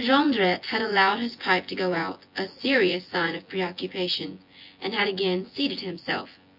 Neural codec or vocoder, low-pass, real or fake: vocoder, 24 kHz, 100 mel bands, Vocos; 5.4 kHz; fake